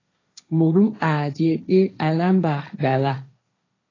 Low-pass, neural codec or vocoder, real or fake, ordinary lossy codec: 7.2 kHz; codec, 16 kHz, 1.1 kbps, Voila-Tokenizer; fake; AAC, 32 kbps